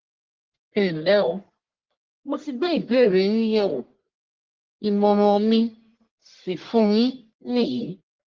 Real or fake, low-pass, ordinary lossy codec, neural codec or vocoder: fake; 7.2 kHz; Opus, 16 kbps; codec, 44.1 kHz, 1.7 kbps, Pupu-Codec